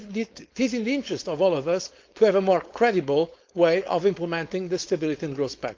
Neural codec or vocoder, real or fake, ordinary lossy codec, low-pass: codec, 16 kHz, 4.8 kbps, FACodec; fake; Opus, 32 kbps; 7.2 kHz